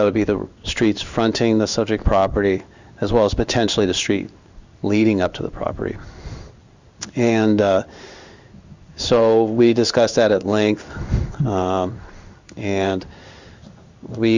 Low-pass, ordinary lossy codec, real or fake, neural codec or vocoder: 7.2 kHz; Opus, 64 kbps; fake; codec, 16 kHz in and 24 kHz out, 1 kbps, XY-Tokenizer